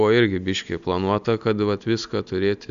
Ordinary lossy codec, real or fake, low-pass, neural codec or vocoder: AAC, 96 kbps; real; 7.2 kHz; none